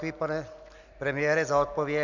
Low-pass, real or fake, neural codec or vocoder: 7.2 kHz; real; none